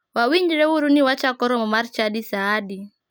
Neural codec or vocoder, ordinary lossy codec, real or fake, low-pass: none; none; real; none